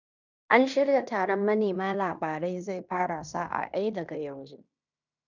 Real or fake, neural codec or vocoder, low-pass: fake; codec, 16 kHz in and 24 kHz out, 0.9 kbps, LongCat-Audio-Codec, fine tuned four codebook decoder; 7.2 kHz